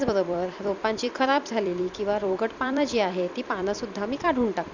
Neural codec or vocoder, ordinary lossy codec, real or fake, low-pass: vocoder, 44.1 kHz, 128 mel bands every 256 samples, BigVGAN v2; none; fake; 7.2 kHz